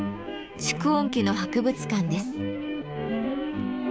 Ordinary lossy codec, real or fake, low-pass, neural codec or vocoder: none; fake; none; codec, 16 kHz, 6 kbps, DAC